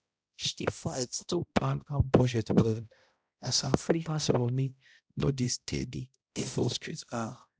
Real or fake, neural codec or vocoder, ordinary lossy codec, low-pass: fake; codec, 16 kHz, 0.5 kbps, X-Codec, HuBERT features, trained on balanced general audio; none; none